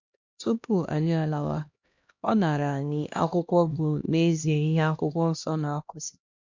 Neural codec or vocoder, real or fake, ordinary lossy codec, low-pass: codec, 16 kHz, 1 kbps, X-Codec, HuBERT features, trained on LibriSpeech; fake; MP3, 64 kbps; 7.2 kHz